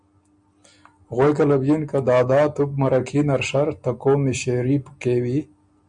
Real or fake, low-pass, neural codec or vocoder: real; 9.9 kHz; none